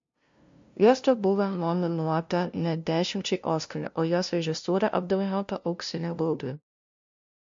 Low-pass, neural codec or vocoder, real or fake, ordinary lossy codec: 7.2 kHz; codec, 16 kHz, 0.5 kbps, FunCodec, trained on LibriTTS, 25 frames a second; fake; MP3, 48 kbps